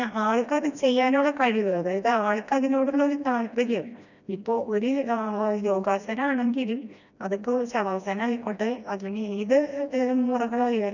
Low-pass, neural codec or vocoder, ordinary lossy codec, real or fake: 7.2 kHz; codec, 16 kHz, 1 kbps, FreqCodec, smaller model; none; fake